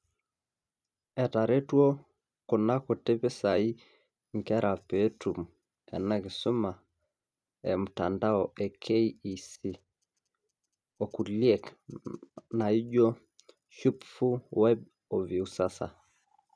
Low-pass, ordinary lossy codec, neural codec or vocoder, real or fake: none; none; none; real